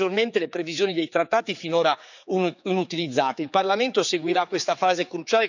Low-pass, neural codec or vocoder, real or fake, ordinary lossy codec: 7.2 kHz; codec, 16 kHz, 4 kbps, X-Codec, HuBERT features, trained on general audio; fake; none